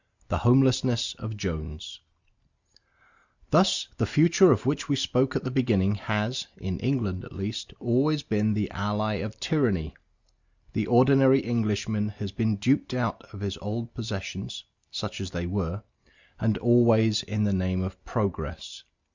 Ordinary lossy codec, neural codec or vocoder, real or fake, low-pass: Opus, 64 kbps; none; real; 7.2 kHz